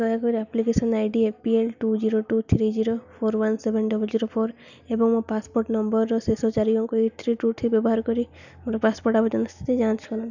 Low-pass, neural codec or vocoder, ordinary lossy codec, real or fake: 7.2 kHz; none; none; real